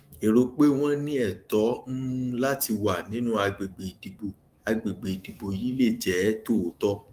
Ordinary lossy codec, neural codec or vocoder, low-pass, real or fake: Opus, 32 kbps; autoencoder, 48 kHz, 128 numbers a frame, DAC-VAE, trained on Japanese speech; 14.4 kHz; fake